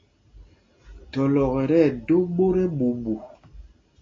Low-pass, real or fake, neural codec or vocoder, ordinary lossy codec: 7.2 kHz; real; none; AAC, 32 kbps